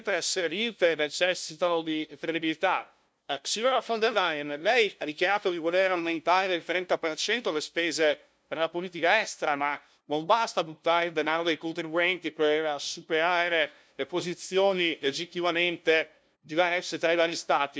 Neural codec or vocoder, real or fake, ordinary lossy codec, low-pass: codec, 16 kHz, 0.5 kbps, FunCodec, trained on LibriTTS, 25 frames a second; fake; none; none